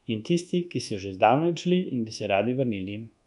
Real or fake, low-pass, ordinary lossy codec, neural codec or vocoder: fake; 10.8 kHz; none; codec, 24 kHz, 1.2 kbps, DualCodec